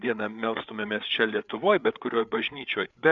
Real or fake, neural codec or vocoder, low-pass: fake; codec, 16 kHz, 8 kbps, FreqCodec, larger model; 7.2 kHz